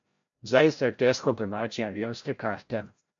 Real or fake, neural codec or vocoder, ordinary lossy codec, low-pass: fake; codec, 16 kHz, 0.5 kbps, FreqCodec, larger model; MP3, 48 kbps; 7.2 kHz